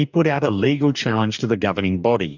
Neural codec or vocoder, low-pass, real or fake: codec, 44.1 kHz, 2.6 kbps, DAC; 7.2 kHz; fake